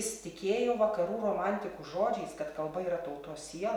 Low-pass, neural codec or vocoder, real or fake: 19.8 kHz; none; real